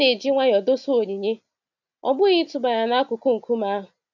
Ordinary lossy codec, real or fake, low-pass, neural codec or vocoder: none; real; 7.2 kHz; none